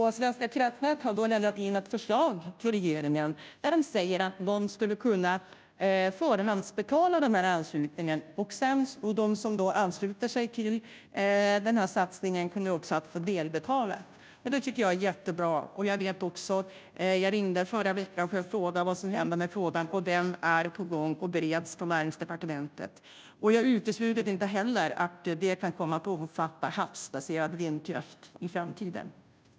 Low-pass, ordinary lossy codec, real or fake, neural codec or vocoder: none; none; fake; codec, 16 kHz, 0.5 kbps, FunCodec, trained on Chinese and English, 25 frames a second